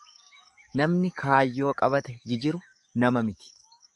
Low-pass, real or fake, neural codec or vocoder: 10.8 kHz; fake; codec, 44.1 kHz, 7.8 kbps, DAC